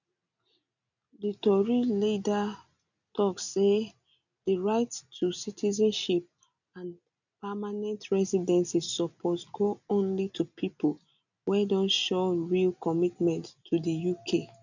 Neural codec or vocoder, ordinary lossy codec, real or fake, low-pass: none; none; real; 7.2 kHz